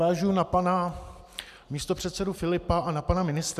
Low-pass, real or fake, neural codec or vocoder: 14.4 kHz; real; none